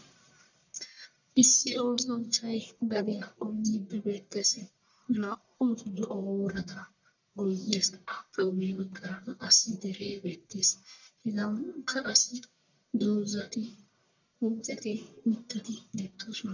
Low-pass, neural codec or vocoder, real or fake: 7.2 kHz; codec, 44.1 kHz, 1.7 kbps, Pupu-Codec; fake